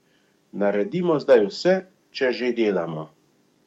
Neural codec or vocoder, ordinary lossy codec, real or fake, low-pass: codec, 44.1 kHz, 7.8 kbps, DAC; MP3, 64 kbps; fake; 19.8 kHz